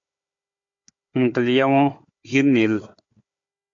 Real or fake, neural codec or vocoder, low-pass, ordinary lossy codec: fake; codec, 16 kHz, 4 kbps, FunCodec, trained on Chinese and English, 50 frames a second; 7.2 kHz; MP3, 48 kbps